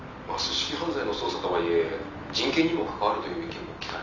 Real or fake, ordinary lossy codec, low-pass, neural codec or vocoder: real; none; 7.2 kHz; none